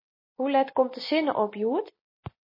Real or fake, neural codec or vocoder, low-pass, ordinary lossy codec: real; none; 5.4 kHz; MP3, 24 kbps